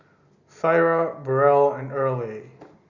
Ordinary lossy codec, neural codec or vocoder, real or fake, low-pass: Opus, 64 kbps; none; real; 7.2 kHz